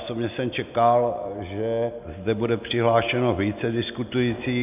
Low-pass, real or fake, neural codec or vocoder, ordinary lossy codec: 3.6 kHz; real; none; AAC, 32 kbps